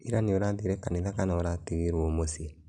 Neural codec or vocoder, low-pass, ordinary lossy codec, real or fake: none; 10.8 kHz; none; real